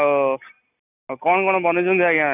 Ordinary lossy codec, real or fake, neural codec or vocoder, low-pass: none; real; none; 3.6 kHz